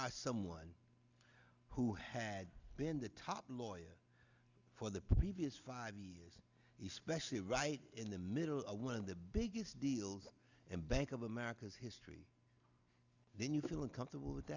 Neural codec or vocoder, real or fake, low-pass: none; real; 7.2 kHz